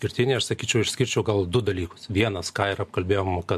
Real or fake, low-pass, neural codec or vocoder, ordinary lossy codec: real; 14.4 kHz; none; MP3, 64 kbps